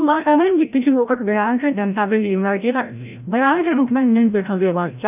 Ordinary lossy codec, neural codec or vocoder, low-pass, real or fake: none; codec, 16 kHz, 0.5 kbps, FreqCodec, larger model; 3.6 kHz; fake